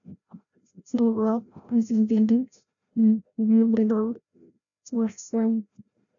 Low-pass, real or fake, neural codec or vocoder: 7.2 kHz; fake; codec, 16 kHz, 0.5 kbps, FreqCodec, larger model